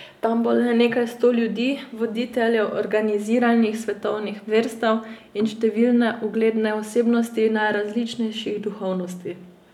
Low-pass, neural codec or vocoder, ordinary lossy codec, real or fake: 19.8 kHz; none; none; real